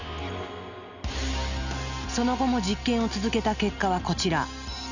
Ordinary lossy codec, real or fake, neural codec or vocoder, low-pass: none; real; none; 7.2 kHz